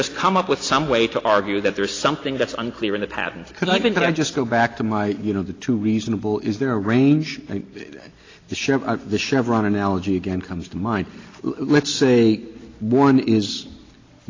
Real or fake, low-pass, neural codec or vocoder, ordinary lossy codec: real; 7.2 kHz; none; AAC, 32 kbps